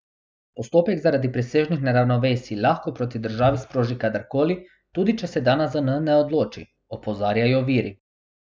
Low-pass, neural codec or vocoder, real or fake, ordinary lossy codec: none; none; real; none